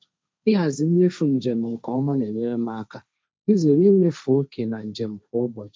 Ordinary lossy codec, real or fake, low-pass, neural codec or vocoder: none; fake; none; codec, 16 kHz, 1.1 kbps, Voila-Tokenizer